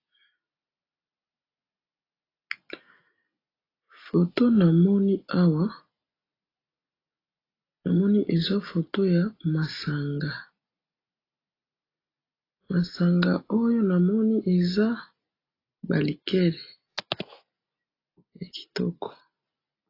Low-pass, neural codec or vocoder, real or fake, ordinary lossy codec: 5.4 kHz; none; real; AAC, 24 kbps